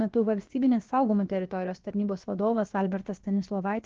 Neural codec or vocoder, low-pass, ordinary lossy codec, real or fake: codec, 16 kHz, about 1 kbps, DyCAST, with the encoder's durations; 7.2 kHz; Opus, 16 kbps; fake